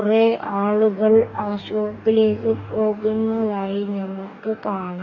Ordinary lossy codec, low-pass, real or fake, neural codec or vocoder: none; 7.2 kHz; fake; codec, 44.1 kHz, 2.6 kbps, DAC